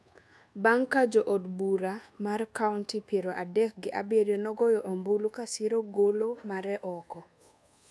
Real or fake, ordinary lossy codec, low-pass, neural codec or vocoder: fake; none; none; codec, 24 kHz, 1.2 kbps, DualCodec